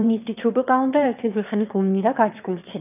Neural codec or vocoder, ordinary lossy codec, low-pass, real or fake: autoencoder, 22.05 kHz, a latent of 192 numbers a frame, VITS, trained on one speaker; none; 3.6 kHz; fake